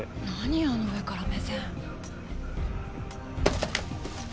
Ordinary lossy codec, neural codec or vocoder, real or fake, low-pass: none; none; real; none